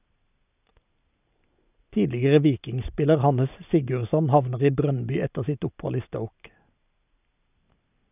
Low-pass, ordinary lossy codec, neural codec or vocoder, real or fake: 3.6 kHz; none; none; real